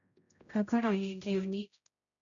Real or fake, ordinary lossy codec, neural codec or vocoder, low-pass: fake; MP3, 96 kbps; codec, 16 kHz, 0.5 kbps, X-Codec, HuBERT features, trained on general audio; 7.2 kHz